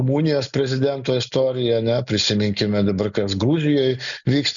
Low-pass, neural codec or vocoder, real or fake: 7.2 kHz; none; real